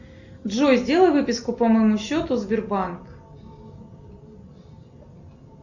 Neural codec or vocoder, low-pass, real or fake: none; 7.2 kHz; real